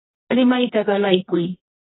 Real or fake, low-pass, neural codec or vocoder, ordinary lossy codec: fake; 7.2 kHz; codec, 24 kHz, 0.9 kbps, WavTokenizer, medium music audio release; AAC, 16 kbps